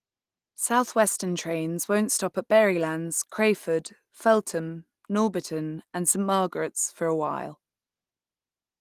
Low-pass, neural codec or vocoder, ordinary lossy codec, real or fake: 14.4 kHz; none; Opus, 24 kbps; real